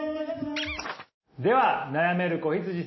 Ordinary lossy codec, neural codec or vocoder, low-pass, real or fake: MP3, 24 kbps; none; 7.2 kHz; real